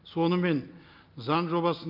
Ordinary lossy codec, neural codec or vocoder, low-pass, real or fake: Opus, 24 kbps; none; 5.4 kHz; real